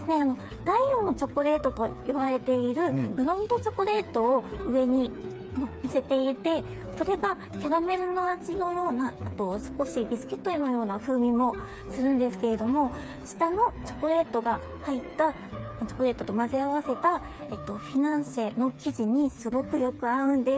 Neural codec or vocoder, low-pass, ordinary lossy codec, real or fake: codec, 16 kHz, 4 kbps, FreqCodec, smaller model; none; none; fake